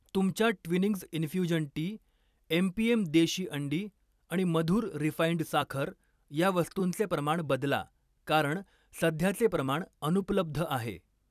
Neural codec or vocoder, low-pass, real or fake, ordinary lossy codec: vocoder, 44.1 kHz, 128 mel bands every 256 samples, BigVGAN v2; 14.4 kHz; fake; none